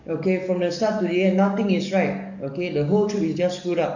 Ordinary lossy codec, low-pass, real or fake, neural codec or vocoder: none; 7.2 kHz; fake; codec, 44.1 kHz, 7.8 kbps, DAC